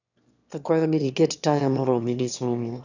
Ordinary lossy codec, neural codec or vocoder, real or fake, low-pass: none; autoencoder, 22.05 kHz, a latent of 192 numbers a frame, VITS, trained on one speaker; fake; 7.2 kHz